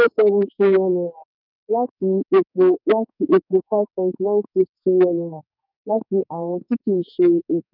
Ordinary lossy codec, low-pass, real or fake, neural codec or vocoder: none; 5.4 kHz; fake; codec, 44.1 kHz, 2.6 kbps, SNAC